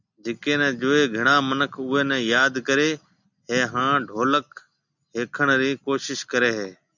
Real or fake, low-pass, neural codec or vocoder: real; 7.2 kHz; none